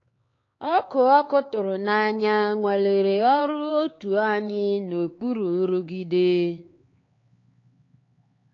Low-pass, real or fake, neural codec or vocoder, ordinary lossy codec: 7.2 kHz; fake; codec, 16 kHz, 4 kbps, X-Codec, HuBERT features, trained on LibriSpeech; AAC, 32 kbps